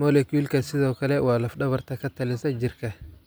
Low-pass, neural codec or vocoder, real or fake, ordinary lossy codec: none; none; real; none